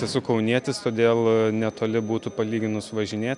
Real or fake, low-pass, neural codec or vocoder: real; 10.8 kHz; none